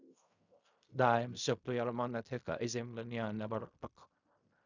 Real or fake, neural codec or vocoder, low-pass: fake; codec, 16 kHz in and 24 kHz out, 0.4 kbps, LongCat-Audio-Codec, fine tuned four codebook decoder; 7.2 kHz